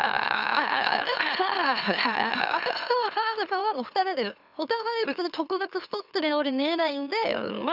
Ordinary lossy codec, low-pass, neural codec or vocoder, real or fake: none; 5.4 kHz; autoencoder, 44.1 kHz, a latent of 192 numbers a frame, MeloTTS; fake